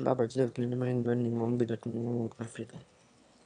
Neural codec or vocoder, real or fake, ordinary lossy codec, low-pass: autoencoder, 22.05 kHz, a latent of 192 numbers a frame, VITS, trained on one speaker; fake; none; 9.9 kHz